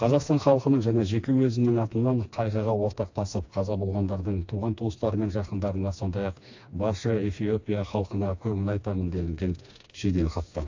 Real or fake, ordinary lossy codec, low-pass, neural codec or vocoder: fake; AAC, 48 kbps; 7.2 kHz; codec, 16 kHz, 2 kbps, FreqCodec, smaller model